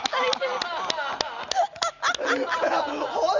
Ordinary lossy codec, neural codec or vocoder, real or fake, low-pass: none; none; real; 7.2 kHz